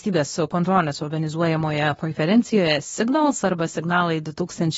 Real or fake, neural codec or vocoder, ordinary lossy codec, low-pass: fake; codec, 24 kHz, 0.9 kbps, WavTokenizer, medium speech release version 2; AAC, 24 kbps; 10.8 kHz